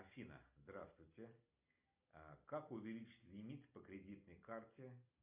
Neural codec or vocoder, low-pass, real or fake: none; 3.6 kHz; real